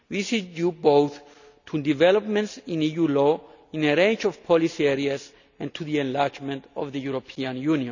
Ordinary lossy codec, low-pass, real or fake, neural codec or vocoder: none; 7.2 kHz; real; none